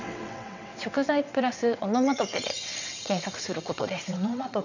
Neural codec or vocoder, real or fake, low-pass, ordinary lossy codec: vocoder, 22.05 kHz, 80 mel bands, WaveNeXt; fake; 7.2 kHz; none